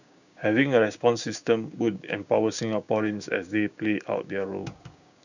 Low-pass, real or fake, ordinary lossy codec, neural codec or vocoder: 7.2 kHz; fake; none; codec, 16 kHz, 6 kbps, DAC